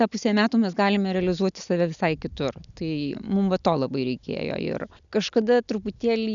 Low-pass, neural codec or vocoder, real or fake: 7.2 kHz; none; real